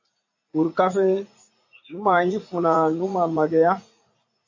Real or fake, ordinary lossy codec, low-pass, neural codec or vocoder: fake; AAC, 48 kbps; 7.2 kHz; vocoder, 44.1 kHz, 80 mel bands, Vocos